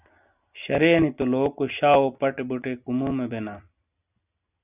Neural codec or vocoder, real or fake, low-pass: none; real; 3.6 kHz